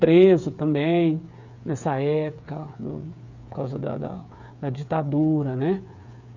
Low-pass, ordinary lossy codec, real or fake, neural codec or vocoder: 7.2 kHz; none; fake; codec, 16 kHz, 8 kbps, FreqCodec, smaller model